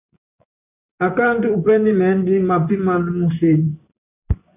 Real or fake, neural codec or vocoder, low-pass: fake; vocoder, 24 kHz, 100 mel bands, Vocos; 3.6 kHz